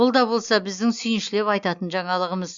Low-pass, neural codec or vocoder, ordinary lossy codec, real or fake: 7.2 kHz; none; Opus, 64 kbps; real